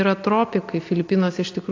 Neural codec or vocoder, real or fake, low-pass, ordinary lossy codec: none; real; 7.2 kHz; AAC, 48 kbps